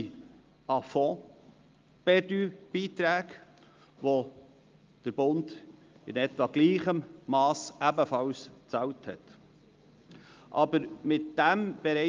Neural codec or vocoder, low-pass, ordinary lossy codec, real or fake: none; 7.2 kHz; Opus, 32 kbps; real